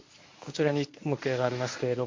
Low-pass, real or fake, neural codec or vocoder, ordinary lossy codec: 7.2 kHz; fake; codec, 24 kHz, 0.9 kbps, WavTokenizer, medium speech release version 1; MP3, 48 kbps